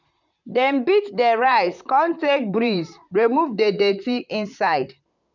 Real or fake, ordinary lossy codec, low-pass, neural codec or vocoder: fake; none; 7.2 kHz; vocoder, 44.1 kHz, 128 mel bands, Pupu-Vocoder